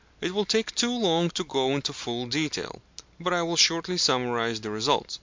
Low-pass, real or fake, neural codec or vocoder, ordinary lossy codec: 7.2 kHz; real; none; MP3, 64 kbps